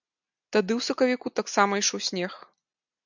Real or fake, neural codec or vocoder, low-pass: real; none; 7.2 kHz